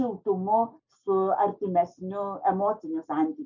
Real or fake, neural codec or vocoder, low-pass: real; none; 7.2 kHz